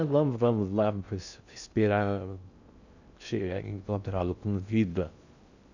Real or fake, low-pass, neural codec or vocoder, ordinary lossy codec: fake; 7.2 kHz; codec, 16 kHz in and 24 kHz out, 0.6 kbps, FocalCodec, streaming, 2048 codes; none